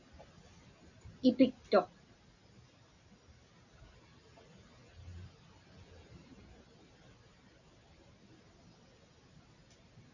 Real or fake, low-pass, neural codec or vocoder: real; 7.2 kHz; none